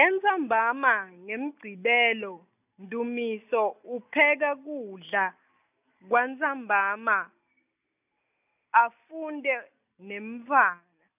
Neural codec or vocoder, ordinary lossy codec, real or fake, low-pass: none; none; real; 3.6 kHz